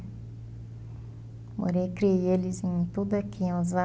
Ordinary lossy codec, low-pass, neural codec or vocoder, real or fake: none; none; none; real